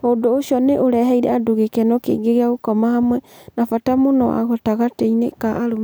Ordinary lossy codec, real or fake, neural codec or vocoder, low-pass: none; real; none; none